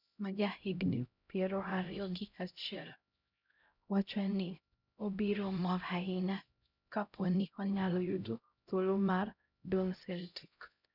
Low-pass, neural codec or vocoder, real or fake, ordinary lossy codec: 5.4 kHz; codec, 16 kHz, 0.5 kbps, X-Codec, HuBERT features, trained on LibriSpeech; fake; none